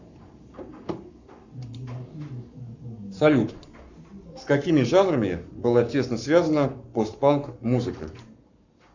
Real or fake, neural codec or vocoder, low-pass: fake; codec, 44.1 kHz, 7.8 kbps, Pupu-Codec; 7.2 kHz